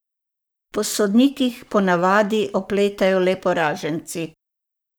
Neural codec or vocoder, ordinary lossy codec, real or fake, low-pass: codec, 44.1 kHz, 7.8 kbps, Pupu-Codec; none; fake; none